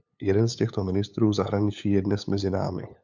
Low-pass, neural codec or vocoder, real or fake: 7.2 kHz; codec, 16 kHz, 8 kbps, FunCodec, trained on LibriTTS, 25 frames a second; fake